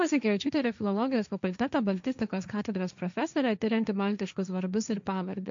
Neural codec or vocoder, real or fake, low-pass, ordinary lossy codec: codec, 16 kHz, 1.1 kbps, Voila-Tokenizer; fake; 7.2 kHz; MP3, 64 kbps